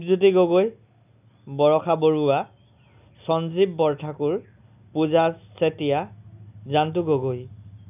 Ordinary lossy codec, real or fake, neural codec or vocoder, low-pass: none; real; none; 3.6 kHz